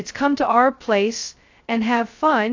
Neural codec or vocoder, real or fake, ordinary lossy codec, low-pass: codec, 16 kHz, 0.2 kbps, FocalCodec; fake; AAC, 48 kbps; 7.2 kHz